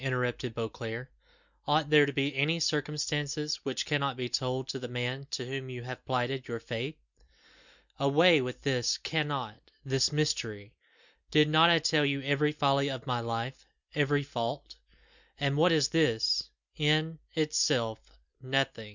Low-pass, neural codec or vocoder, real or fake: 7.2 kHz; none; real